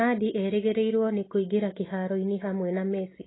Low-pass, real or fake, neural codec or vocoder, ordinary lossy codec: 7.2 kHz; real; none; AAC, 16 kbps